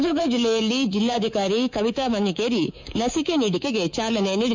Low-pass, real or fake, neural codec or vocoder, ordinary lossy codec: 7.2 kHz; fake; codec, 24 kHz, 3.1 kbps, DualCodec; MP3, 64 kbps